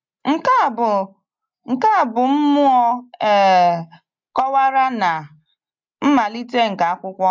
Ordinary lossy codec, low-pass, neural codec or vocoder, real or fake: MP3, 64 kbps; 7.2 kHz; none; real